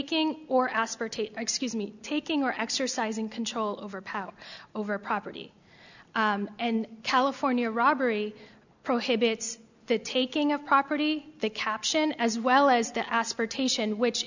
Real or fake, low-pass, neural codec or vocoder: real; 7.2 kHz; none